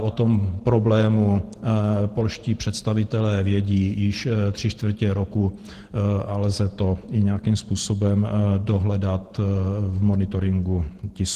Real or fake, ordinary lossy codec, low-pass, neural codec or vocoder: fake; Opus, 16 kbps; 14.4 kHz; vocoder, 48 kHz, 128 mel bands, Vocos